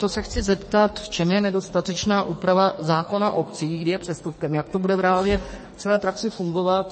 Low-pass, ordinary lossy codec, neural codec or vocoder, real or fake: 9.9 kHz; MP3, 32 kbps; codec, 44.1 kHz, 2.6 kbps, SNAC; fake